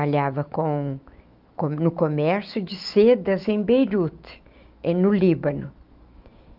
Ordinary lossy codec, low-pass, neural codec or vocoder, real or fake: Opus, 32 kbps; 5.4 kHz; autoencoder, 48 kHz, 128 numbers a frame, DAC-VAE, trained on Japanese speech; fake